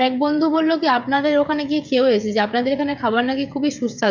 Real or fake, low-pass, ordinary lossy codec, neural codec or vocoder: fake; 7.2 kHz; MP3, 64 kbps; codec, 16 kHz, 16 kbps, FreqCodec, smaller model